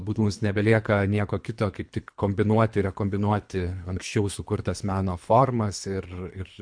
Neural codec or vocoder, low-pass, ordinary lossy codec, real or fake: codec, 24 kHz, 3 kbps, HILCodec; 9.9 kHz; MP3, 64 kbps; fake